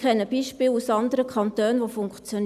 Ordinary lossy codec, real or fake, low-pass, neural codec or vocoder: none; real; 14.4 kHz; none